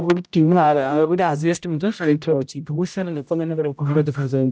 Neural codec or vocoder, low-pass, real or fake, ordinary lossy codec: codec, 16 kHz, 0.5 kbps, X-Codec, HuBERT features, trained on general audio; none; fake; none